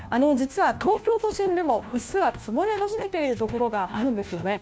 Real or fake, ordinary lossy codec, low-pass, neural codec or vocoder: fake; none; none; codec, 16 kHz, 1 kbps, FunCodec, trained on LibriTTS, 50 frames a second